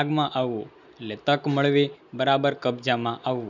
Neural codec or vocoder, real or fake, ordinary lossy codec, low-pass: none; real; none; 7.2 kHz